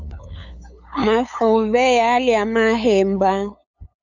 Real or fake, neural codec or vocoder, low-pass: fake; codec, 16 kHz, 8 kbps, FunCodec, trained on LibriTTS, 25 frames a second; 7.2 kHz